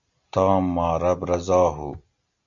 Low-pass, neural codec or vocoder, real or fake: 7.2 kHz; none; real